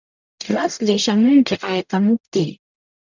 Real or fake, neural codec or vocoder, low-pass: fake; codec, 44.1 kHz, 0.9 kbps, DAC; 7.2 kHz